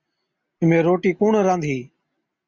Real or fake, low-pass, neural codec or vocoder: real; 7.2 kHz; none